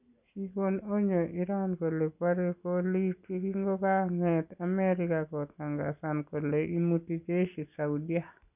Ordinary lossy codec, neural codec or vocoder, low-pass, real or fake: none; none; 3.6 kHz; real